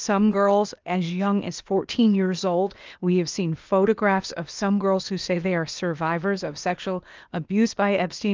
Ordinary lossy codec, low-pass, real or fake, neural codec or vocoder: Opus, 24 kbps; 7.2 kHz; fake; codec, 16 kHz, 0.8 kbps, ZipCodec